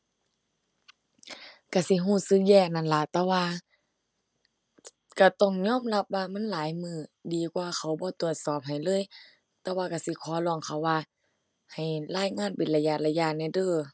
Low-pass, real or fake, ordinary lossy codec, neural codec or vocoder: none; real; none; none